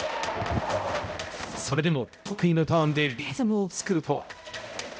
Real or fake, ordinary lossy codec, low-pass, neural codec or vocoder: fake; none; none; codec, 16 kHz, 0.5 kbps, X-Codec, HuBERT features, trained on balanced general audio